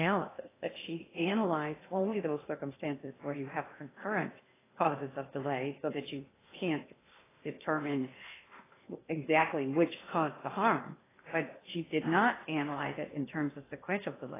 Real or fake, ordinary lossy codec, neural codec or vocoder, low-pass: fake; AAC, 16 kbps; codec, 16 kHz in and 24 kHz out, 0.8 kbps, FocalCodec, streaming, 65536 codes; 3.6 kHz